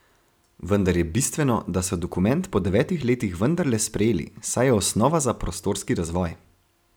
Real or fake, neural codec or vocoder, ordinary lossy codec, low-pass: real; none; none; none